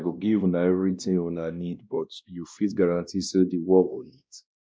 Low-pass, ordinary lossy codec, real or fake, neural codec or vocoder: none; none; fake; codec, 16 kHz, 1 kbps, X-Codec, WavLM features, trained on Multilingual LibriSpeech